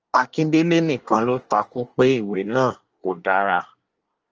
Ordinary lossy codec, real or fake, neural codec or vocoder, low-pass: Opus, 24 kbps; fake; codec, 24 kHz, 1 kbps, SNAC; 7.2 kHz